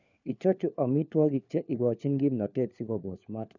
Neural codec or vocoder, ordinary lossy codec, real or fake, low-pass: codec, 16 kHz, 4 kbps, FunCodec, trained on LibriTTS, 50 frames a second; none; fake; 7.2 kHz